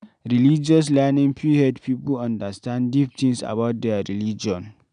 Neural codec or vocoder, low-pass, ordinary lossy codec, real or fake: none; 9.9 kHz; none; real